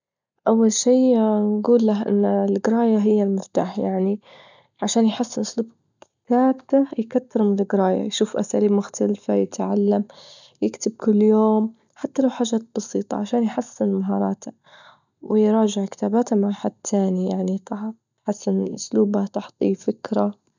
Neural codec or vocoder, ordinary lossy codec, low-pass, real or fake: none; none; 7.2 kHz; real